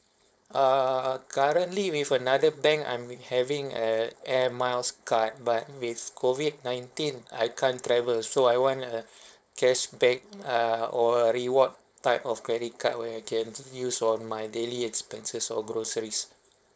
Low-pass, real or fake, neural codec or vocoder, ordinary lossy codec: none; fake; codec, 16 kHz, 4.8 kbps, FACodec; none